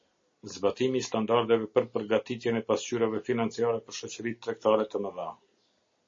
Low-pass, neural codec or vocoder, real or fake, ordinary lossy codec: 7.2 kHz; none; real; MP3, 32 kbps